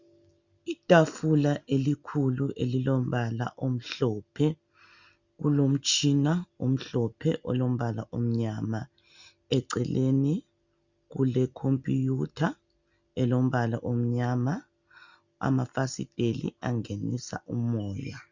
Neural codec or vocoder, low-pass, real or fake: none; 7.2 kHz; real